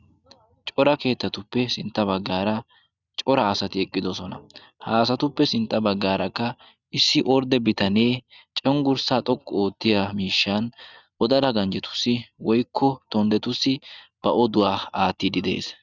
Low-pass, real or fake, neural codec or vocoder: 7.2 kHz; real; none